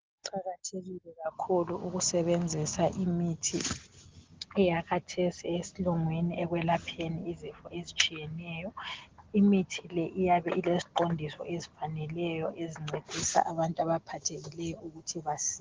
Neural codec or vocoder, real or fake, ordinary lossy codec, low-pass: none; real; Opus, 16 kbps; 7.2 kHz